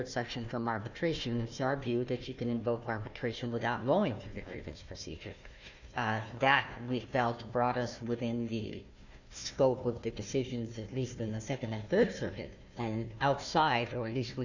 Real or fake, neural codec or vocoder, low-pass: fake; codec, 16 kHz, 1 kbps, FunCodec, trained on Chinese and English, 50 frames a second; 7.2 kHz